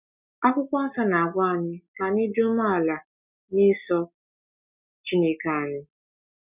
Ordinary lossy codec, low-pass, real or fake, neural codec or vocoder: none; 3.6 kHz; real; none